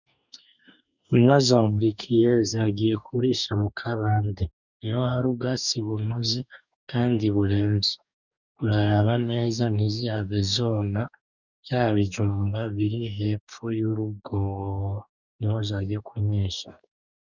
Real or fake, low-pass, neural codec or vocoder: fake; 7.2 kHz; codec, 44.1 kHz, 2.6 kbps, DAC